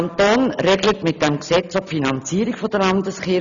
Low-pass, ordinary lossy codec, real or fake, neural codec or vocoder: 7.2 kHz; none; real; none